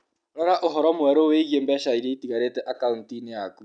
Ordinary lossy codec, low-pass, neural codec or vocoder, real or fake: none; 9.9 kHz; none; real